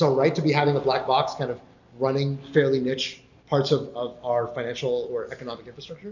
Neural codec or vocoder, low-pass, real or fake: none; 7.2 kHz; real